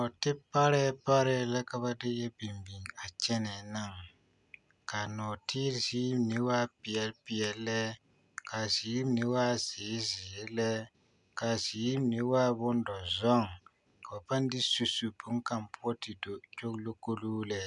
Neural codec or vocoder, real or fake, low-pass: none; real; 10.8 kHz